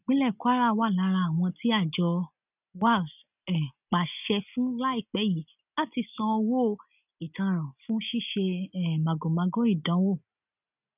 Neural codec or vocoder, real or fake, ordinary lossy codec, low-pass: none; real; none; 3.6 kHz